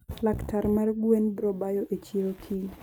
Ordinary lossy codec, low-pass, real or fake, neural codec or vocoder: none; none; real; none